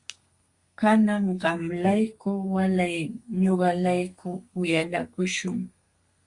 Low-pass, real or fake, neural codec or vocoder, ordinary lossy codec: 10.8 kHz; fake; codec, 32 kHz, 1.9 kbps, SNAC; Opus, 64 kbps